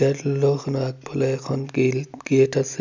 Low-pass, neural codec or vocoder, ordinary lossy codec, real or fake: 7.2 kHz; none; MP3, 64 kbps; real